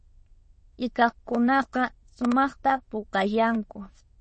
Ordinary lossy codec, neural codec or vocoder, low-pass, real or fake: MP3, 32 kbps; autoencoder, 22.05 kHz, a latent of 192 numbers a frame, VITS, trained on many speakers; 9.9 kHz; fake